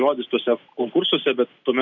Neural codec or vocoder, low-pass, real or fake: none; 7.2 kHz; real